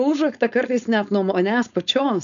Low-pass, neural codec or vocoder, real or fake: 7.2 kHz; codec, 16 kHz, 4.8 kbps, FACodec; fake